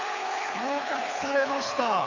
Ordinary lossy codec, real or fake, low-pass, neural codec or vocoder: AAC, 32 kbps; fake; 7.2 kHz; codec, 24 kHz, 6 kbps, HILCodec